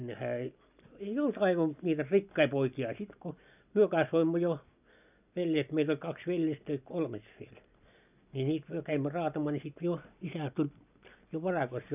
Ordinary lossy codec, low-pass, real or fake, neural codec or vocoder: none; 3.6 kHz; real; none